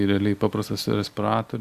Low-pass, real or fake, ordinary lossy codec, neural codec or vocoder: 14.4 kHz; real; Opus, 64 kbps; none